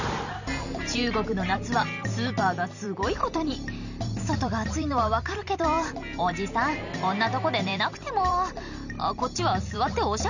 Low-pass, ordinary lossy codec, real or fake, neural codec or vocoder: 7.2 kHz; none; fake; vocoder, 44.1 kHz, 128 mel bands every 256 samples, BigVGAN v2